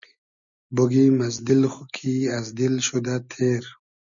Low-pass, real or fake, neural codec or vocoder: 7.2 kHz; real; none